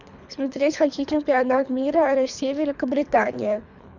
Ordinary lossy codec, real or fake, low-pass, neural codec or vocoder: none; fake; 7.2 kHz; codec, 24 kHz, 3 kbps, HILCodec